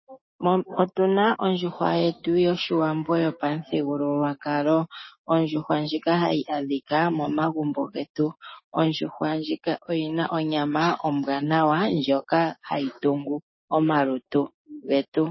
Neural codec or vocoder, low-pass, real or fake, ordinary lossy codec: codec, 16 kHz, 6 kbps, DAC; 7.2 kHz; fake; MP3, 24 kbps